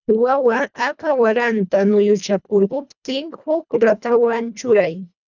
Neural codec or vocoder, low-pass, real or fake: codec, 24 kHz, 1.5 kbps, HILCodec; 7.2 kHz; fake